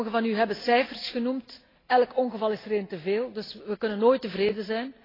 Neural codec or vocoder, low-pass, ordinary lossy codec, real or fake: none; 5.4 kHz; AAC, 24 kbps; real